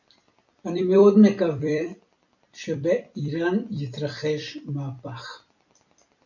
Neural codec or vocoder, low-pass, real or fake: vocoder, 44.1 kHz, 128 mel bands every 256 samples, BigVGAN v2; 7.2 kHz; fake